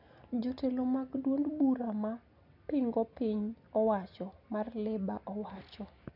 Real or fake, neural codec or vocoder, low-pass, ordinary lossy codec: real; none; 5.4 kHz; none